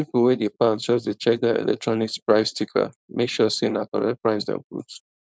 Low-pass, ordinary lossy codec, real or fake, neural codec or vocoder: none; none; fake; codec, 16 kHz, 4.8 kbps, FACodec